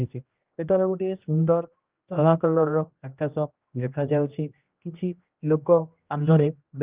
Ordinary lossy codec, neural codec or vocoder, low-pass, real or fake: Opus, 32 kbps; codec, 16 kHz, 1 kbps, X-Codec, HuBERT features, trained on general audio; 3.6 kHz; fake